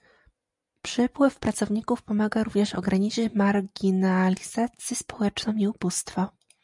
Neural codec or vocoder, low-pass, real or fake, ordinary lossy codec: none; 10.8 kHz; real; MP3, 96 kbps